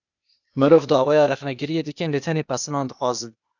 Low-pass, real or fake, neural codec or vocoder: 7.2 kHz; fake; codec, 16 kHz, 0.8 kbps, ZipCodec